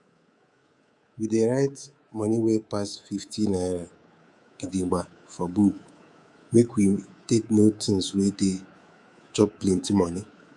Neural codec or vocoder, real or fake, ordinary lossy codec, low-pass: codec, 24 kHz, 3.1 kbps, DualCodec; fake; Opus, 64 kbps; 10.8 kHz